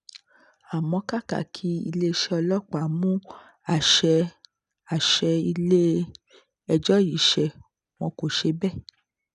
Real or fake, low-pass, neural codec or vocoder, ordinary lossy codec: real; 10.8 kHz; none; none